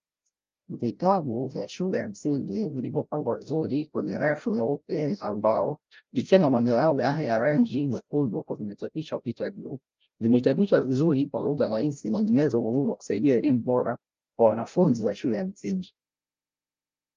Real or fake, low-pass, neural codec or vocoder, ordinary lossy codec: fake; 7.2 kHz; codec, 16 kHz, 0.5 kbps, FreqCodec, larger model; Opus, 32 kbps